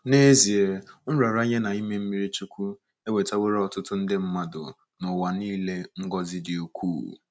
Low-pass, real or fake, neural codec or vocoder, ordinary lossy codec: none; real; none; none